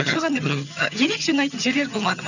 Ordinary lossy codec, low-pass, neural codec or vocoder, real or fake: none; 7.2 kHz; vocoder, 22.05 kHz, 80 mel bands, HiFi-GAN; fake